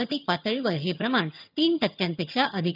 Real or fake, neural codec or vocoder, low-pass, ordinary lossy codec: fake; vocoder, 22.05 kHz, 80 mel bands, HiFi-GAN; 5.4 kHz; none